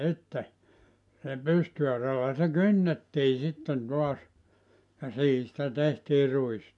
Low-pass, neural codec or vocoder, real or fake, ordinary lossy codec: 10.8 kHz; none; real; MP3, 64 kbps